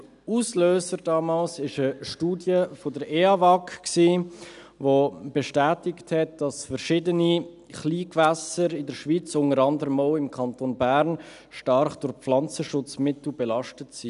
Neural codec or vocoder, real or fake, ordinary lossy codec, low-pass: none; real; none; 10.8 kHz